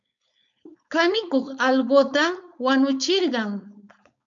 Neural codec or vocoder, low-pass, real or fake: codec, 16 kHz, 4.8 kbps, FACodec; 7.2 kHz; fake